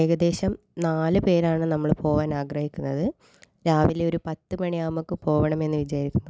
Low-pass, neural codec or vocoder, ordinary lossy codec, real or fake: none; none; none; real